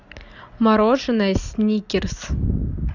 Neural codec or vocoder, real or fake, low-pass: none; real; 7.2 kHz